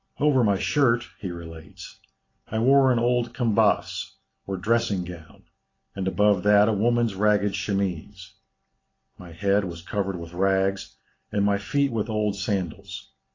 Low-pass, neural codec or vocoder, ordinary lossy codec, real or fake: 7.2 kHz; none; AAC, 32 kbps; real